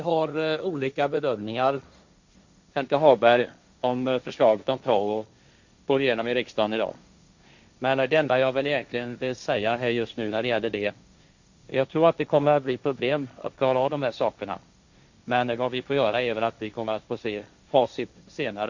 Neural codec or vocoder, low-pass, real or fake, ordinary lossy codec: codec, 16 kHz, 1.1 kbps, Voila-Tokenizer; 7.2 kHz; fake; Opus, 64 kbps